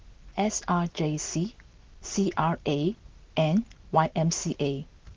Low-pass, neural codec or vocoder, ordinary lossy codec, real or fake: 7.2 kHz; none; Opus, 16 kbps; real